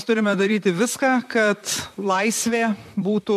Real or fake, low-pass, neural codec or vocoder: fake; 14.4 kHz; vocoder, 44.1 kHz, 128 mel bands, Pupu-Vocoder